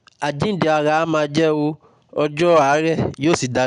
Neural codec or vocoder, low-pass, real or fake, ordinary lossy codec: none; 9.9 kHz; real; none